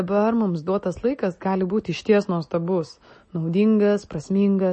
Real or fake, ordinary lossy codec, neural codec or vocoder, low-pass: real; MP3, 32 kbps; none; 10.8 kHz